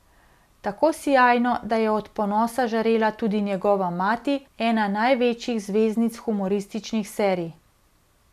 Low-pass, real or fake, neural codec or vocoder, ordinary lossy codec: 14.4 kHz; real; none; none